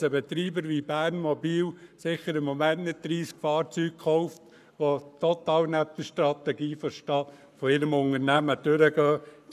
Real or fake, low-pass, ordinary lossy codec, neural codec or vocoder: fake; 14.4 kHz; none; codec, 44.1 kHz, 7.8 kbps, Pupu-Codec